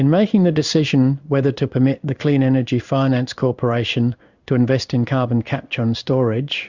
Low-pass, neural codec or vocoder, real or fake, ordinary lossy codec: 7.2 kHz; codec, 16 kHz in and 24 kHz out, 1 kbps, XY-Tokenizer; fake; Opus, 64 kbps